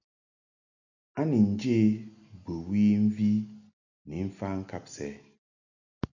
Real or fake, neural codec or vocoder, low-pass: real; none; 7.2 kHz